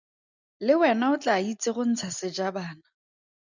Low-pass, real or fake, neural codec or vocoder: 7.2 kHz; real; none